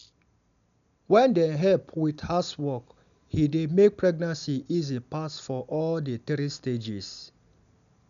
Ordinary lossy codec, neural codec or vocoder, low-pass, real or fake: none; none; 7.2 kHz; real